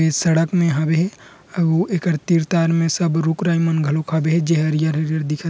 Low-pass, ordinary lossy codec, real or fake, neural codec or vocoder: none; none; real; none